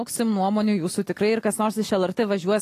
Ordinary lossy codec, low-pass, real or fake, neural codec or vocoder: AAC, 48 kbps; 14.4 kHz; real; none